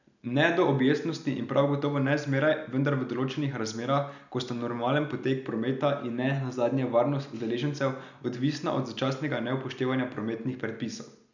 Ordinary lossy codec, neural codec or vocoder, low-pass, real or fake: none; none; 7.2 kHz; real